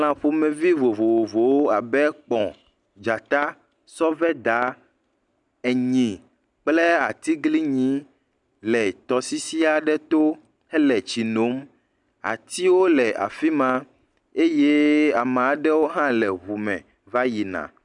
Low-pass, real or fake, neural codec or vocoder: 10.8 kHz; real; none